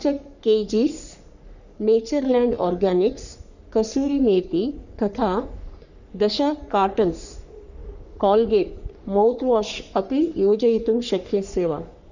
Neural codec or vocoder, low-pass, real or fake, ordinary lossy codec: codec, 44.1 kHz, 3.4 kbps, Pupu-Codec; 7.2 kHz; fake; none